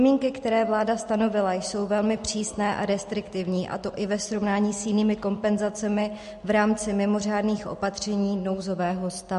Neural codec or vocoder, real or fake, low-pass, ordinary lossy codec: none; real; 14.4 kHz; MP3, 48 kbps